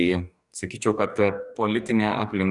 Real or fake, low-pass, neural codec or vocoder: fake; 10.8 kHz; codec, 44.1 kHz, 2.6 kbps, DAC